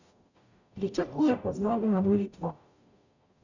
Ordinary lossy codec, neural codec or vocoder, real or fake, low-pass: none; codec, 44.1 kHz, 0.9 kbps, DAC; fake; 7.2 kHz